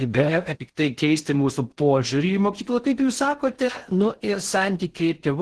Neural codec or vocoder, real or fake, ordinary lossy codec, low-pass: codec, 16 kHz in and 24 kHz out, 0.6 kbps, FocalCodec, streaming, 4096 codes; fake; Opus, 16 kbps; 10.8 kHz